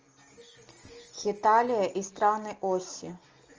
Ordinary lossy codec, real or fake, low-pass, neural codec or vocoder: Opus, 24 kbps; real; 7.2 kHz; none